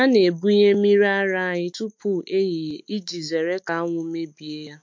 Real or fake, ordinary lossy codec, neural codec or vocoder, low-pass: fake; MP3, 48 kbps; autoencoder, 48 kHz, 128 numbers a frame, DAC-VAE, trained on Japanese speech; 7.2 kHz